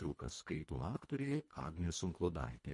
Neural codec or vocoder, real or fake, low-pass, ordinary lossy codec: codec, 24 kHz, 1.5 kbps, HILCodec; fake; 10.8 kHz; MP3, 48 kbps